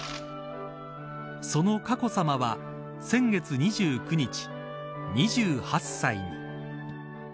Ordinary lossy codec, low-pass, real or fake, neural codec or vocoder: none; none; real; none